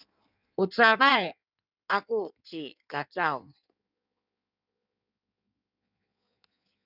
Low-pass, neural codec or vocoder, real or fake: 5.4 kHz; codec, 16 kHz in and 24 kHz out, 1.1 kbps, FireRedTTS-2 codec; fake